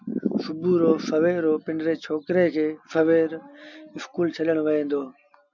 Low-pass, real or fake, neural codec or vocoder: 7.2 kHz; real; none